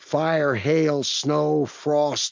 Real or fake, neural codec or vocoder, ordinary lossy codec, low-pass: real; none; MP3, 48 kbps; 7.2 kHz